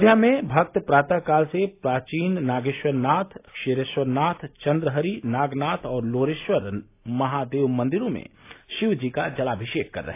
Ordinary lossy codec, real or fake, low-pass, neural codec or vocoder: AAC, 24 kbps; real; 3.6 kHz; none